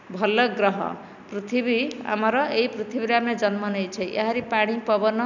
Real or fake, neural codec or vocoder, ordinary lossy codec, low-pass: real; none; none; 7.2 kHz